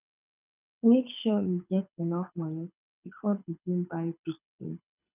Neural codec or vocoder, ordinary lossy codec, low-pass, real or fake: codec, 24 kHz, 6 kbps, HILCodec; none; 3.6 kHz; fake